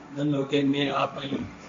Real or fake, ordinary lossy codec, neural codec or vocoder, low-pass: fake; MP3, 48 kbps; codec, 16 kHz, 1.1 kbps, Voila-Tokenizer; 7.2 kHz